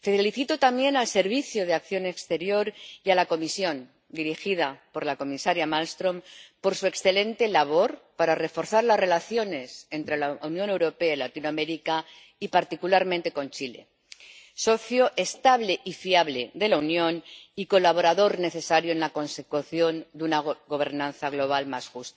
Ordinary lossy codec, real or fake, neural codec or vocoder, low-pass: none; real; none; none